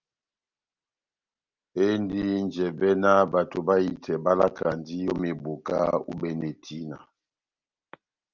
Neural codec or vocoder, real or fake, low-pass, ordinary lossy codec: none; real; 7.2 kHz; Opus, 24 kbps